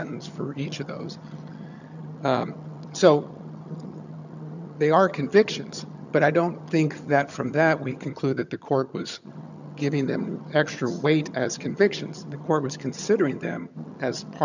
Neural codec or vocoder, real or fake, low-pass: vocoder, 22.05 kHz, 80 mel bands, HiFi-GAN; fake; 7.2 kHz